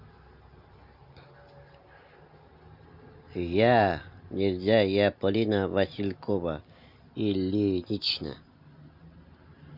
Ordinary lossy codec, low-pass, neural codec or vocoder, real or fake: Opus, 64 kbps; 5.4 kHz; none; real